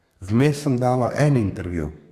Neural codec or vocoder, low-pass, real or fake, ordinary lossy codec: codec, 32 kHz, 1.9 kbps, SNAC; 14.4 kHz; fake; Opus, 64 kbps